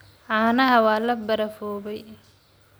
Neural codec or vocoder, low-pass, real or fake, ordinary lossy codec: none; none; real; none